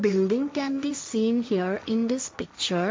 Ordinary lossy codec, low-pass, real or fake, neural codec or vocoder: none; none; fake; codec, 16 kHz, 1.1 kbps, Voila-Tokenizer